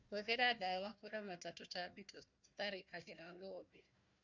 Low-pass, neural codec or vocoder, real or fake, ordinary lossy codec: 7.2 kHz; codec, 16 kHz, 1 kbps, FunCodec, trained on Chinese and English, 50 frames a second; fake; none